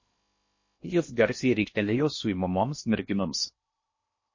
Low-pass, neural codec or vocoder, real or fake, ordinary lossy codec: 7.2 kHz; codec, 16 kHz in and 24 kHz out, 0.6 kbps, FocalCodec, streaming, 2048 codes; fake; MP3, 32 kbps